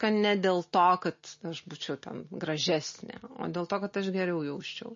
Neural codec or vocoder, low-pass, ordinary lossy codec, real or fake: none; 7.2 kHz; MP3, 32 kbps; real